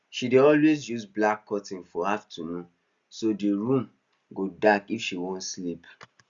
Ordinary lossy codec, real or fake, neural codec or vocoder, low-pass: Opus, 64 kbps; real; none; 7.2 kHz